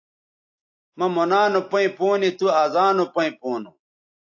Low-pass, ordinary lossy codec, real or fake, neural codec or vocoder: 7.2 kHz; AAC, 48 kbps; real; none